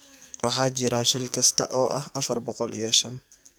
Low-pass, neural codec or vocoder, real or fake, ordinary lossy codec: none; codec, 44.1 kHz, 2.6 kbps, SNAC; fake; none